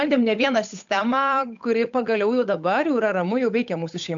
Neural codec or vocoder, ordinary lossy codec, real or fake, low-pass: codec, 16 kHz, 8 kbps, FunCodec, trained on Chinese and English, 25 frames a second; MP3, 64 kbps; fake; 7.2 kHz